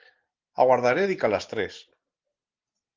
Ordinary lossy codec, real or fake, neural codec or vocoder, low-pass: Opus, 16 kbps; real; none; 7.2 kHz